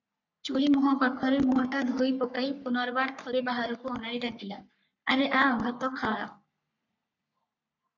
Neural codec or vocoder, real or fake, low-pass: codec, 44.1 kHz, 3.4 kbps, Pupu-Codec; fake; 7.2 kHz